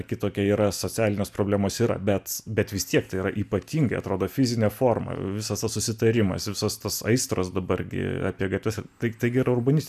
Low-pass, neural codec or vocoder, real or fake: 14.4 kHz; none; real